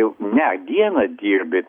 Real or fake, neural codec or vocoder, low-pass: real; none; 19.8 kHz